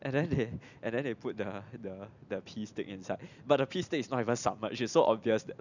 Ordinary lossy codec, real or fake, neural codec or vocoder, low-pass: none; real; none; 7.2 kHz